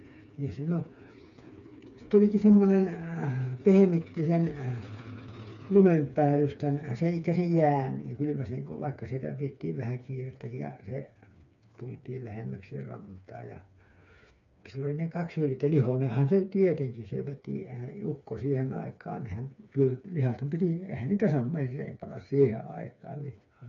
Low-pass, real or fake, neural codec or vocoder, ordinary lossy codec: 7.2 kHz; fake; codec, 16 kHz, 4 kbps, FreqCodec, smaller model; none